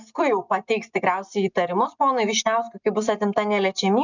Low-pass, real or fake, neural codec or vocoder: 7.2 kHz; real; none